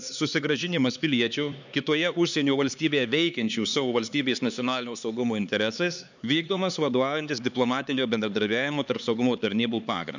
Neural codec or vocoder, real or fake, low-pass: codec, 16 kHz, 4 kbps, X-Codec, HuBERT features, trained on LibriSpeech; fake; 7.2 kHz